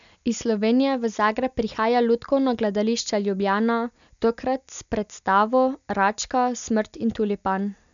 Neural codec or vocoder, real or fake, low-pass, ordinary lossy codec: none; real; 7.2 kHz; none